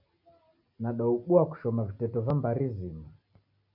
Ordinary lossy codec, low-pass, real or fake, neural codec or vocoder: MP3, 48 kbps; 5.4 kHz; real; none